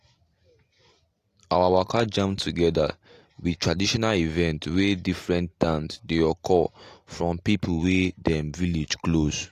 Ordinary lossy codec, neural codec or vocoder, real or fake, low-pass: AAC, 48 kbps; none; real; 14.4 kHz